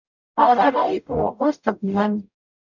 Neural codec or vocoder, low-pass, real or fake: codec, 44.1 kHz, 0.9 kbps, DAC; 7.2 kHz; fake